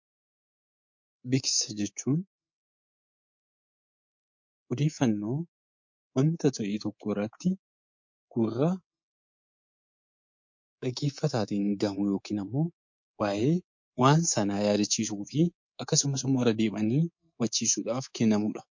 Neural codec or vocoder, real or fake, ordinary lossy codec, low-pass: codec, 24 kHz, 3.1 kbps, DualCodec; fake; MP3, 48 kbps; 7.2 kHz